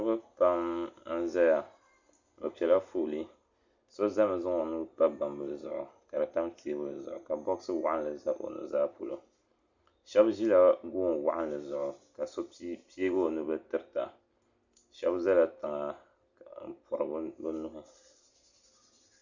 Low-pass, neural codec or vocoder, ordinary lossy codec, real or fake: 7.2 kHz; none; Opus, 64 kbps; real